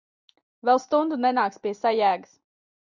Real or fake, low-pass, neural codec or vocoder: real; 7.2 kHz; none